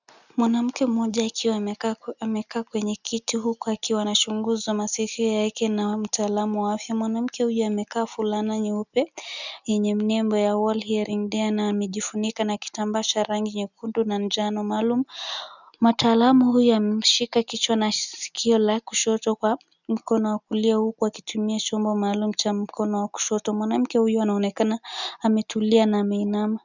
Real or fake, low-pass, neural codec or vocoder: real; 7.2 kHz; none